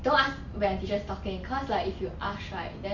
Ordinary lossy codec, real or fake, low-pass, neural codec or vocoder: Opus, 64 kbps; real; 7.2 kHz; none